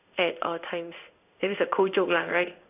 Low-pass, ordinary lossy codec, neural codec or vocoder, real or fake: 3.6 kHz; none; codec, 16 kHz in and 24 kHz out, 1 kbps, XY-Tokenizer; fake